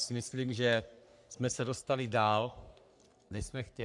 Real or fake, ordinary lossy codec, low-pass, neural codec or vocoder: fake; MP3, 96 kbps; 10.8 kHz; codec, 44.1 kHz, 3.4 kbps, Pupu-Codec